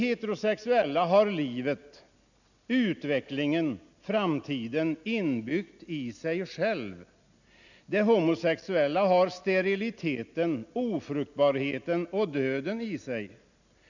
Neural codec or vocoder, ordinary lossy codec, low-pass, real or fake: none; none; 7.2 kHz; real